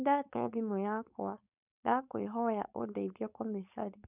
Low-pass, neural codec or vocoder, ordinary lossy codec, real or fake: 3.6 kHz; codec, 16 kHz, 4.8 kbps, FACodec; none; fake